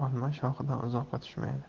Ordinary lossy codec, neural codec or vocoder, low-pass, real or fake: Opus, 16 kbps; none; 7.2 kHz; real